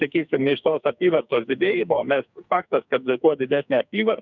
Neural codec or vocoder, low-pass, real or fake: codec, 44.1 kHz, 2.6 kbps, SNAC; 7.2 kHz; fake